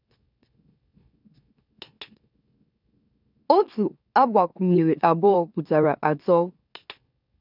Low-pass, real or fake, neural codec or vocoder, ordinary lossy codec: 5.4 kHz; fake; autoencoder, 44.1 kHz, a latent of 192 numbers a frame, MeloTTS; none